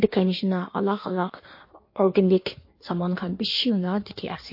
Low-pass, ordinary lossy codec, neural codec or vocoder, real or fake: 5.4 kHz; MP3, 32 kbps; codec, 16 kHz in and 24 kHz out, 1.1 kbps, FireRedTTS-2 codec; fake